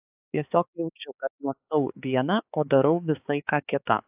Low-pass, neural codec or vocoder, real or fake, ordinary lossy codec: 3.6 kHz; codec, 16 kHz, 4 kbps, X-Codec, HuBERT features, trained on balanced general audio; fake; AAC, 32 kbps